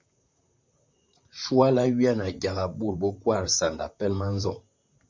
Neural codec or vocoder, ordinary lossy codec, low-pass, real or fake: vocoder, 44.1 kHz, 128 mel bands, Pupu-Vocoder; MP3, 64 kbps; 7.2 kHz; fake